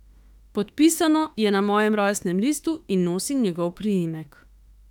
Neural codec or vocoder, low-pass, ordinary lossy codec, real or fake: autoencoder, 48 kHz, 32 numbers a frame, DAC-VAE, trained on Japanese speech; 19.8 kHz; none; fake